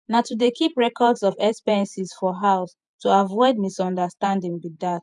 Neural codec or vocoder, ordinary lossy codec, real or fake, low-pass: vocoder, 48 kHz, 128 mel bands, Vocos; none; fake; 10.8 kHz